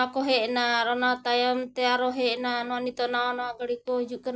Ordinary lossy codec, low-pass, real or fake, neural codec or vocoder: none; none; real; none